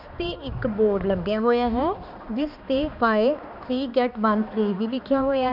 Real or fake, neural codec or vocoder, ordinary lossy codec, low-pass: fake; codec, 16 kHz, 2 kbps, X-Codec, HuBERT features, trained on balanced general audio; none; 5.4 kHz